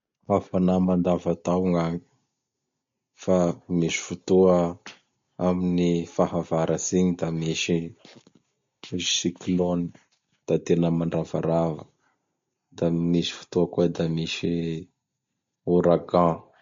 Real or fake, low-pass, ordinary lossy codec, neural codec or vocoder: real; 7.2 kHz; MP3, 48 kbps; none